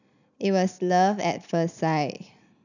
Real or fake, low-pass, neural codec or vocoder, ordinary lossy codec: real; 7.2 kHz; none; none